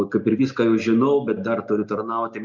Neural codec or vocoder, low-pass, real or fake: none; 7.2 kHz; real